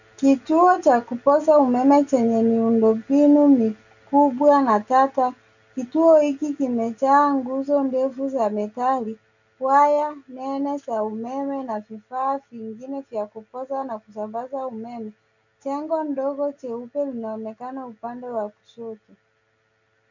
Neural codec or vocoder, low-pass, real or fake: none; 7.2 kHz; real